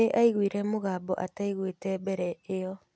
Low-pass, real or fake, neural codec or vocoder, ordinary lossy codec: none; real; none; none